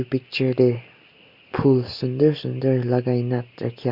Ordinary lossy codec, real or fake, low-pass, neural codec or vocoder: Opus, 64 kbps; real; 5.4 kHz; none